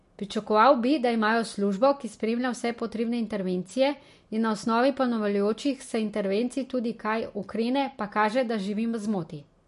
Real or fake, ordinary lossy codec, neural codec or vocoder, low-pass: real; MP3, 48 kbps; none; 14.4 kHz